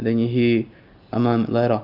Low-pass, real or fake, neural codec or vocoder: 5.4 kHz; real; none